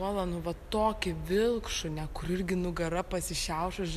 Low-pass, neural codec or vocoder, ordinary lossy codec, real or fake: 14.4 kHz; none; MP3, 96 kbps; real